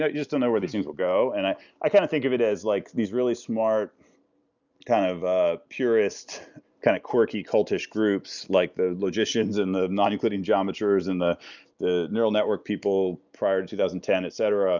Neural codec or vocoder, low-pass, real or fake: none; 7.2 kHz; real